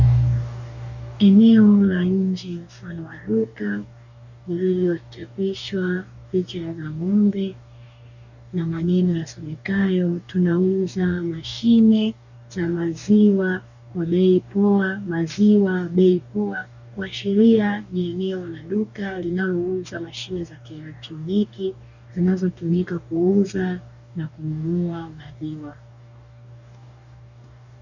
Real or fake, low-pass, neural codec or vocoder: fake; 7.2 kHz; codec, 44.1 kHz, 2.6 kbps, DAC